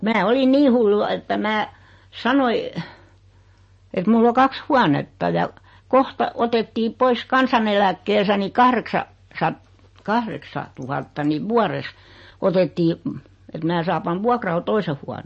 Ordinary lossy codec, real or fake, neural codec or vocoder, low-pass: MP3, 32 kbps; real; none; 10.8 kHz